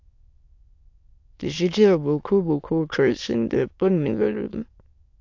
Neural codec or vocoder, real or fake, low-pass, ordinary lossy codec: autoencoder, 22.05 kHz, a latent of 192 numbers a frame, VITS, trained on many speakers; fake; 7.2 kHz; AAC, 48 kbps